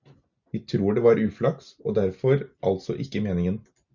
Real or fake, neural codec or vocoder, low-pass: real; none; 7.2 kHz